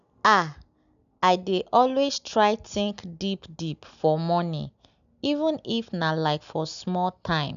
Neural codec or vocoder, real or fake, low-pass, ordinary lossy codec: none; real; 7.2 kHz; none